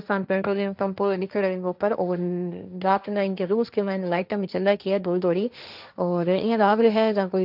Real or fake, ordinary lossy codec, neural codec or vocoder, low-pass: fake; none; codec, 16 kHz, 1.1 kbps, Voila-Tokenizer; 5.4 kHz